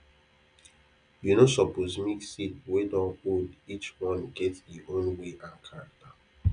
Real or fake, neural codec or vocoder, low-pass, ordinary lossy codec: real; none; 9.9 kHz; none